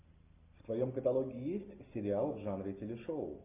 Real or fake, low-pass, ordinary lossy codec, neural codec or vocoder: real; 3.6 kHz; MP3, 32 kbps; none